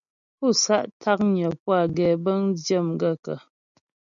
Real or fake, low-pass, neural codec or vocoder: real; 7.2 kHz; none